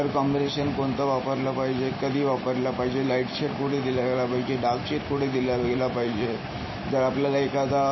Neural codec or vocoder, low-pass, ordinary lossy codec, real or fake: none; 7.2 kHz; MP3, 24 kbps; real